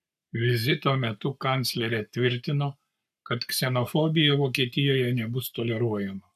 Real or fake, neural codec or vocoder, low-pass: fake; codec, 44.1 kHz, 7.8 kbps, Pupu-Codec; 14.4 kHz